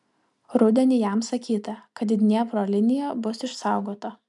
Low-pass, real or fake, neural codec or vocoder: 10.8 kHz; real; none